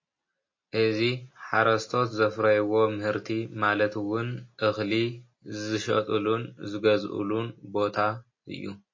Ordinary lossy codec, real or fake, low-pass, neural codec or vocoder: MP3, 32 kbps; real; 7.2 kHz; none